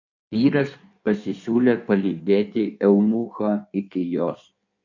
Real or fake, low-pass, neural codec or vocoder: fake; 7.2 kHz; codec, 16 kHz in and 24 kHz out, 1.1 kbps, FireRedTTS-2 codec